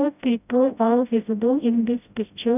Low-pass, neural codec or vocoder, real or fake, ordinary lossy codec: 3.6 kHz; codec, 16 kHz, 0.5 kbps, FreqCodec, smaller model; fake; none